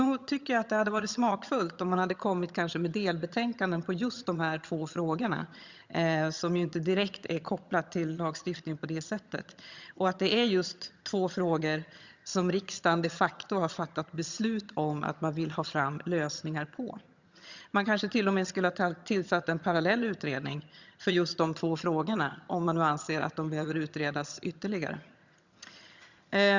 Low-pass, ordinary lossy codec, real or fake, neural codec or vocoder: 7.2 kHz; Opus, 64 kbps; fake; vocoder, 22.05 kHz, 80 mel bands, HiFi-GAN